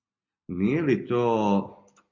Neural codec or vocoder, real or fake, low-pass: none; real; 7.2 kHz